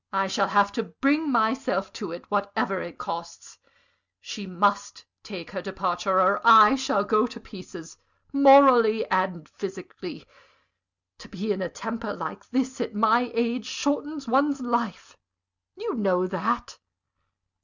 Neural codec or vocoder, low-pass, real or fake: none; 7.2 kHz; real